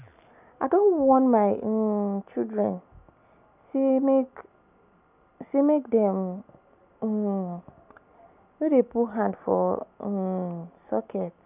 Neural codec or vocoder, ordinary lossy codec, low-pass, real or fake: none; none; 3.6 kHz; real